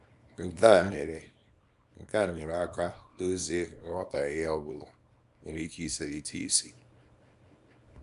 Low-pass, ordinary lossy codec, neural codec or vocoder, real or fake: 10.8 kHz; none; codec, 24 kHz, 0.9 kbps, WavTokenizer, small release; fake